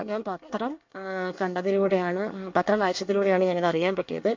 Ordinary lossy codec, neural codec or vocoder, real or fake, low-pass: MP3, 48 kbps; codec, 24 kHz, 1 kbps, SNAC; fake; 7.2 kHz